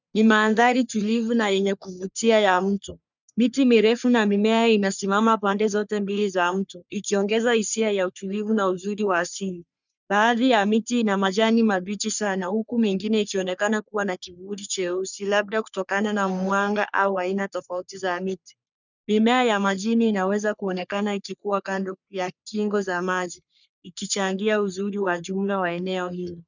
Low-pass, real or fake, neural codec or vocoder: 7.2 kHz; fake; codec, 44.1 kHz, 3.4 kbps, Pupu-Codec